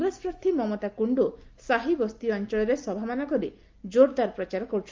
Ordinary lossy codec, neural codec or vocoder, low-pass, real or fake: Opus, 32 kbps; none; 7.2 kHz; real